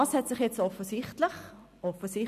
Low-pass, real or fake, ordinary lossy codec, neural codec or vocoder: 14.4 kHz; real; none; none